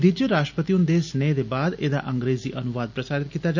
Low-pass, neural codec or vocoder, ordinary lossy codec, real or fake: 7.2 kHz; none; none; real